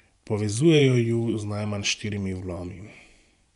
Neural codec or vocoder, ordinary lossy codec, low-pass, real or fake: vocoder, 24 kHz, 100 mel bands, Vocos; none; 10.8 kHz; fake